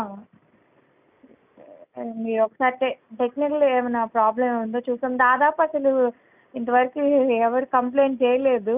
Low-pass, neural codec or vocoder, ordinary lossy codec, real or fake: 3.6 kHz; none; none; real